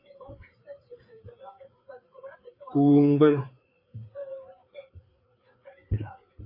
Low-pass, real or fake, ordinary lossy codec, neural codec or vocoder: 5.4 kHz; fake; AAC, 32 kbps; codec, 16 kHz, 4 kbps, FreqCodec, larger model